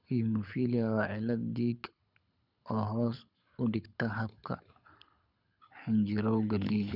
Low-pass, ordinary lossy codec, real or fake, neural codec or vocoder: 5.4 kHz; none; fake; codec, 24 kHz, 6 kbps, HILCodec